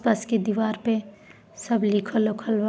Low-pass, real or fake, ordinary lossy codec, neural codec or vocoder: none; real; none; none